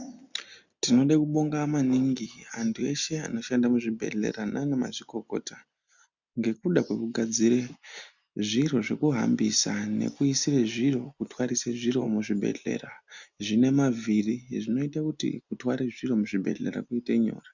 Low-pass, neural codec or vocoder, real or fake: 7.2 kHz; none; real